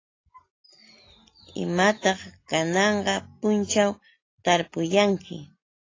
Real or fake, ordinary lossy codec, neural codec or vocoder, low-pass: real; AAC, 32 kbps; none; 7.2 kHz